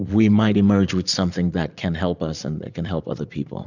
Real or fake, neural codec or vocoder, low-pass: fake; vocoder, 44.1 kHz, 80 mel bands, Vocos; 7.2 kHz